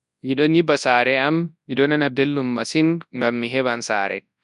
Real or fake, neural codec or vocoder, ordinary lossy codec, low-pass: fake; codec, 24 kHz, 0.9 kbps, WavTokenizer, large speech release; none; 10.8 kHz